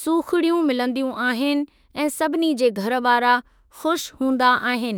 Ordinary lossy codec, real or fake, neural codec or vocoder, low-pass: none; fake; autoencoder, 48 kHz, 32 numbers a frame, DAC-VAE, trained on Japanese speech; none